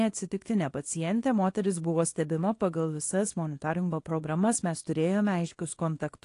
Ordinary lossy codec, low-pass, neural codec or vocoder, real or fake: AAC, 48 kbps; 10.8 kHz; codec, 24 kHz, 0.9 kbps, WavTokenizer, small release; fake